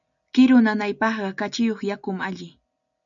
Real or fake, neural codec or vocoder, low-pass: real; none; 7.2 kHz